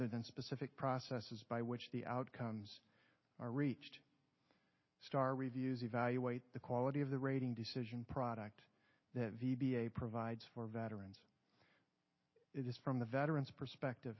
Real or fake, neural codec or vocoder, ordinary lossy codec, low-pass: real; none; MP3, 24 kbps; 7.2 kHz